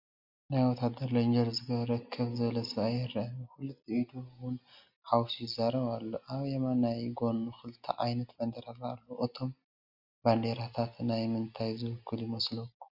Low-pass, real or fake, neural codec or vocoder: 5.4 kHz; real; none